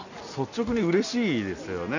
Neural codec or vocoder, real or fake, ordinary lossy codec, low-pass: none; real; none; 7.2 kHz